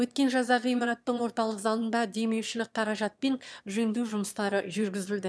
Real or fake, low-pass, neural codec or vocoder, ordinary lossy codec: fake; none; autoencoder, 22.05 kHz, a latent of 192 numbers a frame, VITS, trained on one speaker; none